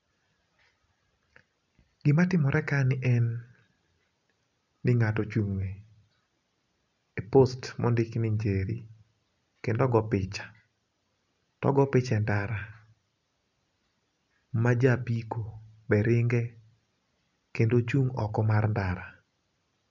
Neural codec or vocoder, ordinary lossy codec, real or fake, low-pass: none; none; real; 7.2 kHz